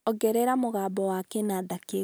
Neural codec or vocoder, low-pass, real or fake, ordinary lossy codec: none; none; real; none